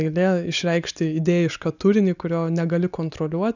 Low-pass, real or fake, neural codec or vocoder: 7.2 kHz; real; none